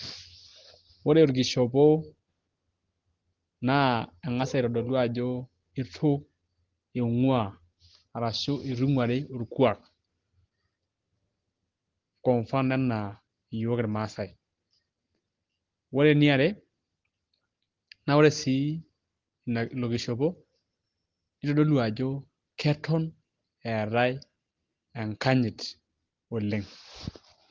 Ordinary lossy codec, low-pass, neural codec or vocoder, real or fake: Opus, 16 kbps; 7.2 kHz; none; real